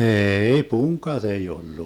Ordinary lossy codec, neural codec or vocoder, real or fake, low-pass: none; vocoder, 48 kHz, 128 mel bands, Vocos; fake; 19.8 kHz